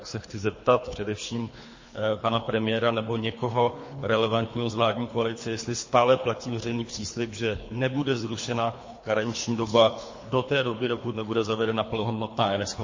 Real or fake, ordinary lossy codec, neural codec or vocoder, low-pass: fake; MP3, 32 kbps; codec, 24 kHz, 3 kbps, HILCodec; 7.2 kHz